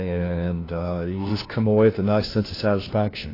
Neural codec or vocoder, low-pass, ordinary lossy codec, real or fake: codec, 16 kHz, 1 kbps, FunCodec, trained on LibriTTS, 50 frames a second; 5.4 kHz; AAC, 24 kbps; fake